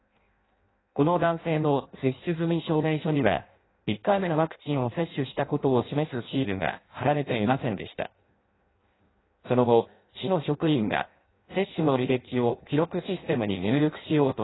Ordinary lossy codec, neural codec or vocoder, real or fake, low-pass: AAC, 16 kbps; codec, 16 kHz in and 24 kHz out, 0.6 kbps, FireRedTTS-2 codec; fake; 7.2 kHz